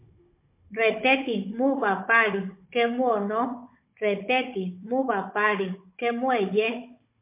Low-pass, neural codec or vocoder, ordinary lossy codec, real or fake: 3.6 kHz; codec, 16 kHz, 16 kbps, FunCodec, trained on Chinese and English, 50 frames a second; MP3, 24 kbps; fake